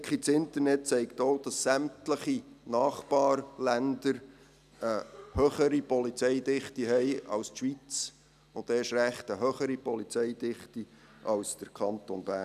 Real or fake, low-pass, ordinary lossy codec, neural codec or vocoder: real; 14.4 kHz; none; none